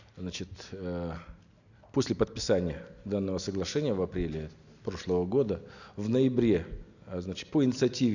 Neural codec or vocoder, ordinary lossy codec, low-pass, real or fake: none; none; 7.2 kHz; real